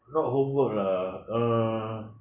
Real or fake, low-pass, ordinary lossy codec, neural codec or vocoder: real; 3.6 kHz; none; none